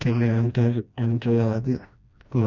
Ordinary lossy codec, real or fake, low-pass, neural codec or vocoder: none; fake; 7.2 kHz; codec, 16 kHz, 1 kbps, FreqCodec, smaller model